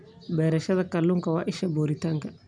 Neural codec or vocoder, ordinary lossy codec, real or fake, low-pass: none; none; real; 9.9 kHz